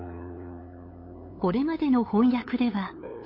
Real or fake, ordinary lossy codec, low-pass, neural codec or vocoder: fake; MP3, 32 kbps; 5.4 kHz; codec, 16 kHz, 8 kbps, FunCodec, trained on LibriTTS, 25 frames a second